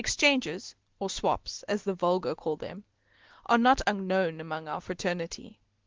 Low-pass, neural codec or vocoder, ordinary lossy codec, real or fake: 7.2 kHz; none; Opus, 16 kbps; real